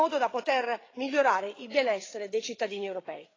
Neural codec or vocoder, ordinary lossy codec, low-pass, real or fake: codec, 16 kHz, 8 kbps, FreqCodec, larger model; AAC, 32 kbps; 7.2 kHz; fake